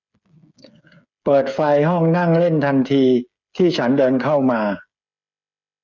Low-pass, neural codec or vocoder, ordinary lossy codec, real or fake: 7.2 kHz; codec, 16 kHz, 8 kbps, FreqCodec, smaller model; none; fake